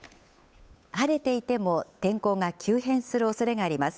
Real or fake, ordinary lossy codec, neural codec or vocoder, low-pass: fake; none; codec, 16 kHz, 8 kbps, FunCodec, trained on Chinese and English, 25 frames a second; none